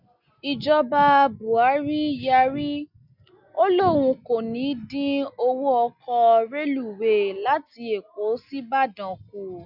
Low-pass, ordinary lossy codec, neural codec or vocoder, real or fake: 5.4 kHz; none; none; real